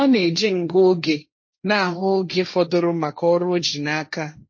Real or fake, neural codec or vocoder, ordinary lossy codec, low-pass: fake; codec, 16 kHz, 1.1 kbps, Voila-Tokenizer; MP3, 32 kbps; 7.2 kHz